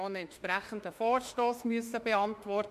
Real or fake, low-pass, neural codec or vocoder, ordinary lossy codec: fake; 14.4 kHz; autoencoder, 48 kHz, 32 numbers a frame, DAC-VAE, trained on Japanese speech; MP3, 64 kbps